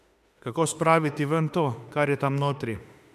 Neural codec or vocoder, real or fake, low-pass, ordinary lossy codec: autoencoder, 48 kHz, 32 numbers a frame, DAC-VAE, trained on Japanese speech; fake; 14.4 kHz; MP3, 96 kbps